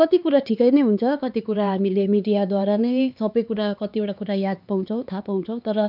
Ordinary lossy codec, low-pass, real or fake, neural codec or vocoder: none; 5.4 kHz; fake; codec, 16 kHz, 4 kbps, X-Codec, HuBERT features, trained on LibriSpeech